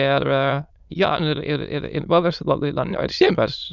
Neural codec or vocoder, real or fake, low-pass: autoencoder, 22.05 kHz, a latent of 192 numbers a frame, VITS, trained on many speakers; fake; 7.2 kHz